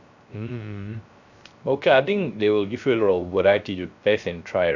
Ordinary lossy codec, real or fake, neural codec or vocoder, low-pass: none; fake; codec, 16 kHz, 0.3 kbps, FocalCodec; 7.2 kHz